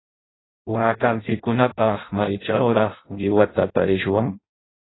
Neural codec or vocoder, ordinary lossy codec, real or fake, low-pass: codec, 16 kHz in and 24 kHz out, 0.6 kbps, FireRedTTS-2 codec; AAC, 16 kbps; fake; 7.2 kHz